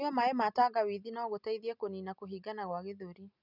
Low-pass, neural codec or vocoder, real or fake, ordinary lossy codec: 5.4 kHz; none; real; none